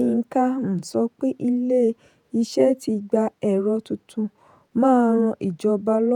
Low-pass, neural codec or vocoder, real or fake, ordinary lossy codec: 19.8 kHz; vocoder, 48 kHz, 128 mel bands, Vocos; fake; none